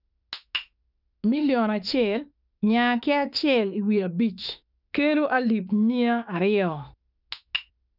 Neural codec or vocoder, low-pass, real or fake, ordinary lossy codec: autoencoder, 48 kHz, 32 numbers a frame, DAC-VAE, trained on Japanese speech; 5.4 kHz; fake; none